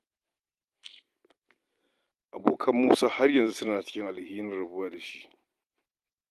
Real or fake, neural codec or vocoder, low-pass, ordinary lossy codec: fake; vocoder, 44.1 kHz, 128 mel bands every 256 samples, BigVGAN v2; 14.4 kHz; Opus, 32 kbps